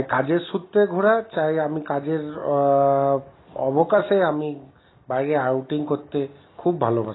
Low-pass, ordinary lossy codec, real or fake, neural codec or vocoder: 7.2 kHz; AAC, 16 kbps; real; none